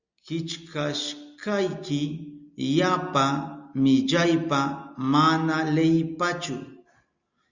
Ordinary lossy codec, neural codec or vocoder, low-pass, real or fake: Opus, 64 kbps; none; 7.2 kHz; real